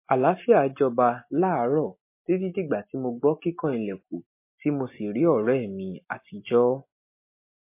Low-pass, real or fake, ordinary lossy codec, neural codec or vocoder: 3.6 kHz; real; MP3, 24 kbps; none